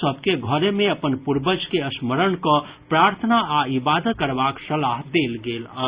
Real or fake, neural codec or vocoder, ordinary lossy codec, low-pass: real; none; Opus, 64 kbps; 3.6 kHz